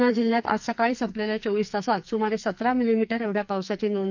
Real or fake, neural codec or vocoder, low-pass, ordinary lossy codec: fake; codec, 44.1 kHz, 2.6 kbps, SNAC; 7.2 kHz; none